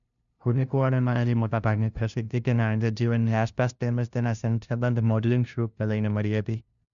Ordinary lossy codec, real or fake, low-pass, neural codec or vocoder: none; fake; 7.2 kHz; codec, 16 kHz, 0.5 kbps, FunCodec, trained on LibriTTS, 25 frames a second